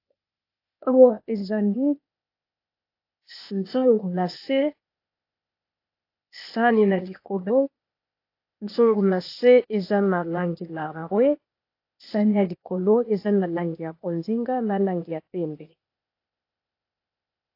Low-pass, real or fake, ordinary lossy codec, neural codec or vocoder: 5.4 kHz; fake; AAC, 32 kbps; codec, 16 kHz, 0.8 kbps, ZipCodec